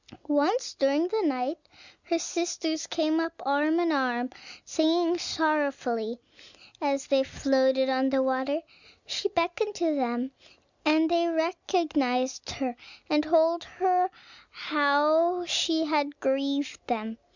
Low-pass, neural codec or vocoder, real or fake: 7.2 kHz; none; real